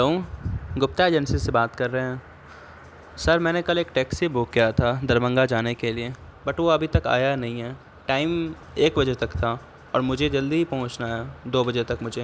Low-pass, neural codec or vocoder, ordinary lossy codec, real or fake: none; none; none; real